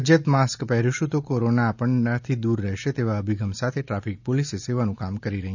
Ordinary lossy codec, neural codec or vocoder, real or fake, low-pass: none; none; real; 7.2 kHz